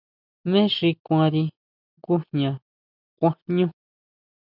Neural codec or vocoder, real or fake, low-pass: none; real; 5.4 kHz